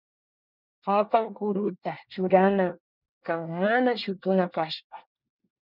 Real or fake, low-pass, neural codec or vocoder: fake; 5.4 kHz; codec, 24 kHz, 1 kbps, SNAC